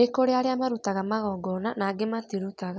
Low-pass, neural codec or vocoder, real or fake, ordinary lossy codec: none; none; real; none